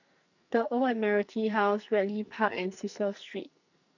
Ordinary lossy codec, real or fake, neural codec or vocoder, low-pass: none; fake; codec, 44.1 kHz, 2.6 kbps, SNAC; 7.2 kHz